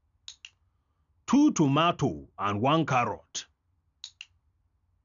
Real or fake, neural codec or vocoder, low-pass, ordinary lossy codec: real; none; 7.2 kHz; none